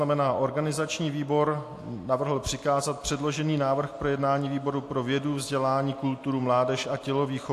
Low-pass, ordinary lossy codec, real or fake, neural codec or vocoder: 14.4 kHz; AAC, 64 kbps; real; none